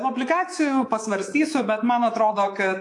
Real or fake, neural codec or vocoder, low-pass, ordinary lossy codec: fake; codec, 24 kHz, 3.1 kbps, DualCodec; 10.8 kHz; AAC, 48 kbps